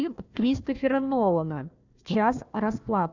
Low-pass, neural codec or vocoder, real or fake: 7.2 kHz; codec, 16 kHz, 1 kbps, FunCodec, trained on Chinese and English, 50 frames a second; fake